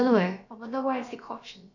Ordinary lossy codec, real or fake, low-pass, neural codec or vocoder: none; fake; 7.2 kHz; codec, 16 kHz, about 1 kbps, DyCAST, with the encoder's durations